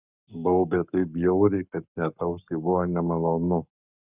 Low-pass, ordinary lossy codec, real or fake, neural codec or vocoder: 3.6 kHz; Opus, 64 kbps; fake; codec, 44.1 kHz, 7.8 kbps, Pupu-Codec